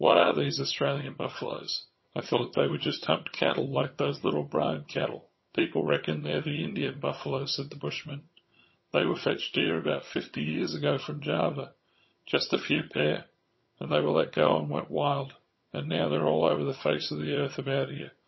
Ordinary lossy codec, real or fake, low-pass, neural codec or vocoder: MP3, 24 kbps; fake; 7.2 kHz; vocoder, 22.05 kHz, 80 mel bands, HiFi-GAN